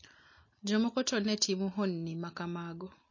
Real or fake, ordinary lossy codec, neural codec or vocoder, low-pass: real; MP3, 32 kbps; none; 7.2 kHz